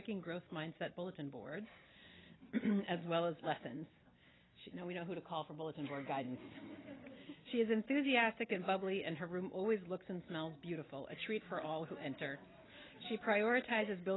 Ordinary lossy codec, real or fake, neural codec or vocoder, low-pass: AAC, 16 kbps; real; none; 7.2 kHz